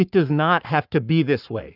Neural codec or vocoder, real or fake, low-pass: codec, 44.1 kHz, 7.8 kbps, Pupu-Codec; fake; 5.4 kHz